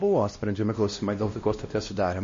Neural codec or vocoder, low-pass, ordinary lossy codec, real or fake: codec, 16 kHz, 1 kbps, X-Codec, WavLM features, trained on Multilingual LibriSpeech; 7.2 kHz; MP3, 32 kbps; fake